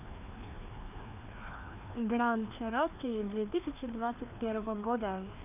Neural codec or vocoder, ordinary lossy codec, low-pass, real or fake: codec, 16 kHz, 2 kbps, FreqCodec, larger model; none; 3.6 kHz; fake